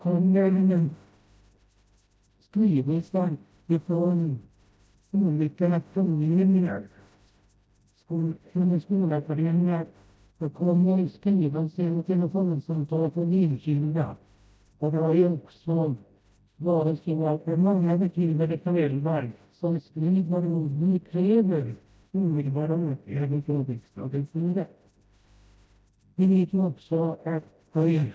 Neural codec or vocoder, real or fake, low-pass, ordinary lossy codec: codec, 16 kHz, 0.5 kbps, FreqCodec, smaller model; fake; none; none